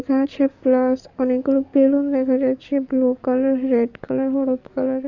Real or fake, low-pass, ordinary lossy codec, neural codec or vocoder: fake; 7.2 kHz; none; codec, 44.1 kHz, 7.8 kbps, DAC